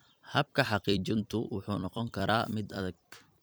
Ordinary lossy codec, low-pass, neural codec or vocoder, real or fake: none; none; vocoder, 44.1 kHz, 128 mel bands every 256 samples, BigVGAN v2; fake